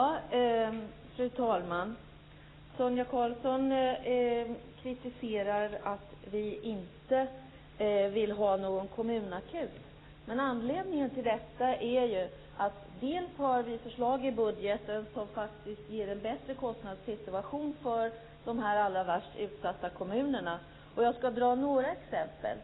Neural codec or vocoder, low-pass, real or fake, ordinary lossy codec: none; 7.2 kHz; real; AAC, 16 kbps